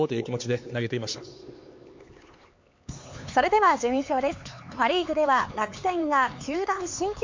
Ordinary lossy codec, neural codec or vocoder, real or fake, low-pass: MP3, 48 kbps; codec, 16 kHz, 4 kbps, X-Codec, HuBERT features, trained on LibriSpeech; fake; 7.2 kHz